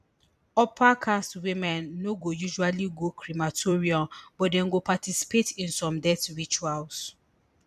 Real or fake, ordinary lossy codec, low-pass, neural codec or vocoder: fake; none; 14.4 kHz; vocoder, 44.1 kHz, 128 mel bands every 256 samples, BigVGAN v2